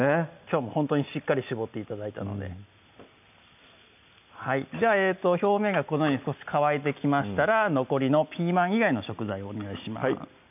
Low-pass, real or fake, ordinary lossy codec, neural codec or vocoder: 3.6 kHz; real; none; none